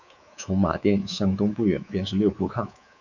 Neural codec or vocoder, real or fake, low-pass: codec, 24 kHz, 3.1 kbps, DualCodec; fake; 7.2 kHz